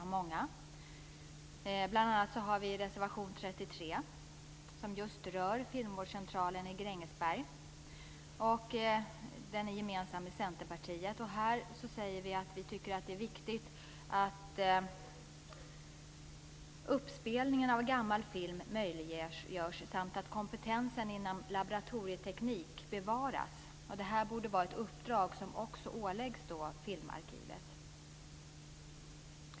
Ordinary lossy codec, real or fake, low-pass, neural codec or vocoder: none; real; none; none